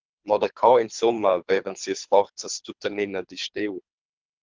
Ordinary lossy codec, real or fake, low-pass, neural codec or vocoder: Opus, 32 kbps; fake; 7.2 kHz; codec, 24 kHz, 3 kbps, HILCodec